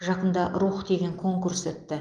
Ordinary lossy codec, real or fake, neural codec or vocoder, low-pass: Opus, 24 kbps; real; none; 9.9 kHz